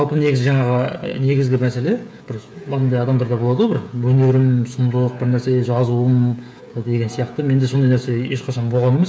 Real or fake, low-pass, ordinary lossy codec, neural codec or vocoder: fake; none; none; codec, 16 kHz, 16 kbps, FreqCodec, smaller model